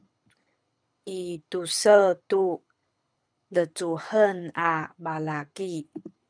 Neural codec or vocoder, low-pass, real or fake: codec, 24 kHz, 6 kbps, HILCodec; 9.9 kHz; fake